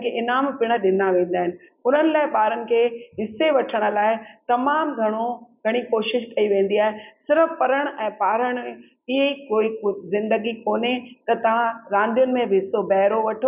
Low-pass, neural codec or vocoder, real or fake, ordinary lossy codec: 3.6 kHz; none; real; none